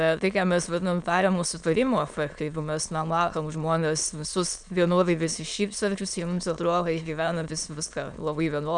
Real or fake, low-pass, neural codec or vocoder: fake; 9.9 kHz; autoencoder, 22.05 kHz, a latent of 192 numbers a frame, VITS, trained on many speakers